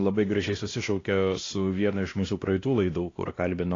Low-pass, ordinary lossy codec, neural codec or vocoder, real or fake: 7.2 kHz; AAC, 32 kbps; codec, 16 kHz, 1 kbps, X-Codec, WavLM features, trained on Multilingual LibriSpeech; fake